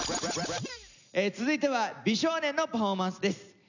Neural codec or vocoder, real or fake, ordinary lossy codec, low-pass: none; real; none; 7.2 kHz